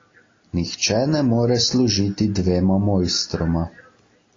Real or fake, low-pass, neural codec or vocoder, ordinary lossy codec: real; 7.2 kHz; none; AAC, 32 kbps